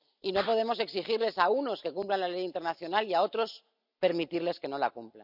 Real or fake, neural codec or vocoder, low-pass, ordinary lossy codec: real; none; 5.4 kHz; none